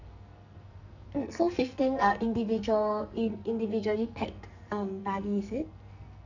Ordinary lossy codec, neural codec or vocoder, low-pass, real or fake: none; codec, 44.1 kHz, 2.6 kbps, SNAC; 7.2 kHz; fake